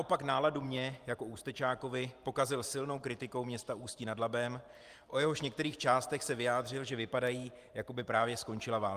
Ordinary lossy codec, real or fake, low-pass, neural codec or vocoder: Opus, 32 kbps; fake; 14.4 kHz; vocoder, 44.1 kHz, 128 mel bands every 512 samples, BigVGAN v2